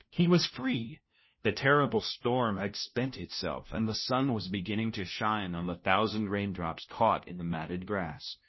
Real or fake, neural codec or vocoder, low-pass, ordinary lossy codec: fake; codec, 16 kHz, 1 kbps, FunCodec, trained on LibriTTS, 50 frames a second; 7.2 kHz; MP3, 24 kbps